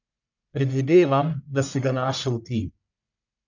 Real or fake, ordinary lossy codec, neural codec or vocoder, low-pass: fake; none; codec, 44.1 kHz, 1.7 kbps, Pupu-Codec; 7.2 kHz